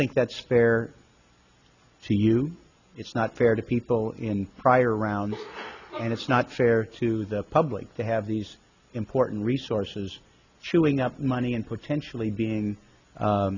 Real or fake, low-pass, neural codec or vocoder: real; 7.2 kHz; none